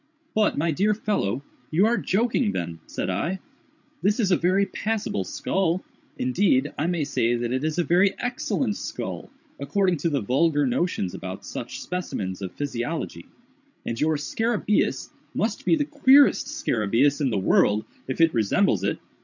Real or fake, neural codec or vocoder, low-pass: fake; codec, 16 kHz, 16 kbps, FreqCodec, larger model; 7.2 kHz